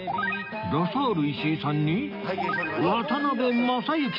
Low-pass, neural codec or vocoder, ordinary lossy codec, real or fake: 5.4 kHz; none; none; real